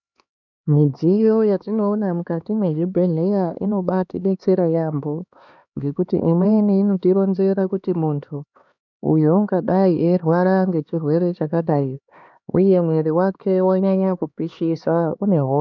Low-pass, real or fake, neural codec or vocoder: 7.2 kHz; fake; codec, 16 kHz, 2 kbps, X-Codec, HuBERT features, trained on LibriSpeech